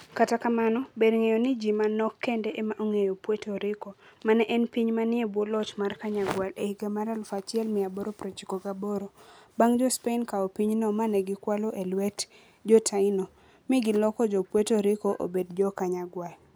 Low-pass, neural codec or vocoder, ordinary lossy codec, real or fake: none; none; none; real